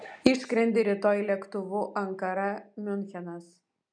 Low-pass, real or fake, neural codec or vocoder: 9.9 kHz; real; none